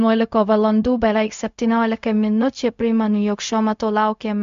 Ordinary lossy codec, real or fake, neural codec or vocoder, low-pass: MP3, 64 kbps; fake; codec, 16 kHz, 0.4 kbps, LongCat-Audio-Codec; 7.2 kHz